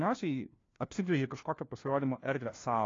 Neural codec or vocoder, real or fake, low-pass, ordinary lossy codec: codec, 16 kHz, 1 kbps, FunCodec, trained on LibriTTS, 50 frames a second; fake; 7.2 kHz; AAC, 32 kbps